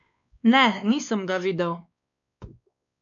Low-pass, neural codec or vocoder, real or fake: 7.2 kHz; codec, 16 kHz, 2 kbps, X-Codec, WavLM features, trained on Multilingual LibriSpeech; fake